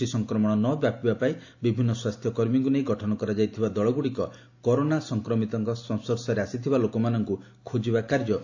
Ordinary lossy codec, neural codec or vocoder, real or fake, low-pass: AAC, 48 kbps; none; real; 7.2 kHz